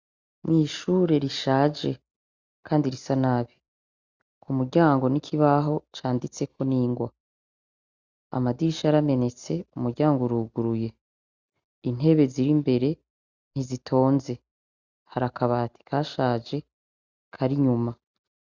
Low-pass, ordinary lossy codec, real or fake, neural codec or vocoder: 7.2 kHz; Opus, 64 kbps; real; none